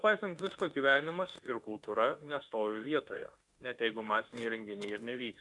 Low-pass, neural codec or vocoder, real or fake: 10.8 kHz; autoencoder, 48 kHz, 32 numbers a frame, DAC-VAE, trained on Japanese speech; fake